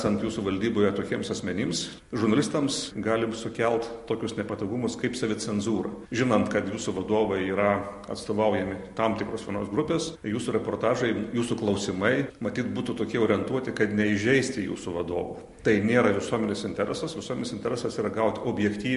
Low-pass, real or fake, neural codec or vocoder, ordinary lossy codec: 14.4 kHz; real; none; MP3, 48 kbps